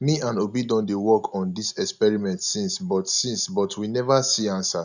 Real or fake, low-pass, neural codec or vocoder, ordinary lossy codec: real; 7.2 kHz; none; none